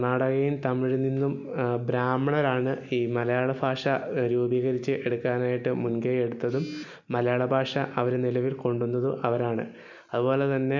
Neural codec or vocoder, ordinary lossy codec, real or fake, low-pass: none; MP3, 64 kbps; real; 7.2 kHz